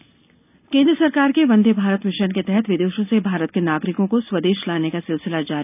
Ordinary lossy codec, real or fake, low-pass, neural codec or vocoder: none; real; 3.6 kHz; none